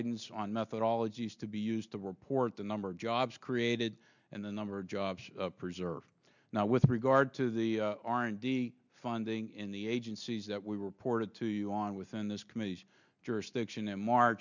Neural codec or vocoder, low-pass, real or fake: none; 7.2 kHz; real